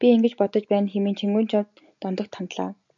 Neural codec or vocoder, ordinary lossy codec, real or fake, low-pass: none; MP3, 96 kbps; real; 7.2 kHz